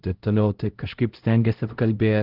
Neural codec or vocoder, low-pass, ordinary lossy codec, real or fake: codec, 16 kHz, 0.5 kbps, X-Codec, HuBERT features, trained on LibriSpeech; 5.4 kHz; Opus, 16 kbps; fake